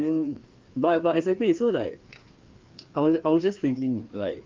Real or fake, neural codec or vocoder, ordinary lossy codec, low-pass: fake; codec, 16 kHz, 2 kbps, FreqCodec, larger model; Opus, 32 kbps; 7.2 kHz